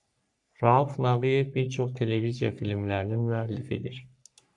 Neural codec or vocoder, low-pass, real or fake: codec, 44.1 kHz, 3.4 kbps, Pupu-Codec; 10.8 kHz; fake